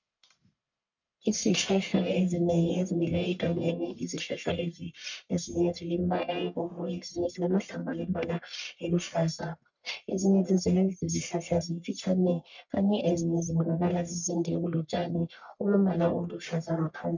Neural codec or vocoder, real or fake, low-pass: codec, 44.1 kHz, 1.7 kbps, Pupu-Codec; fake; 7.2 kHz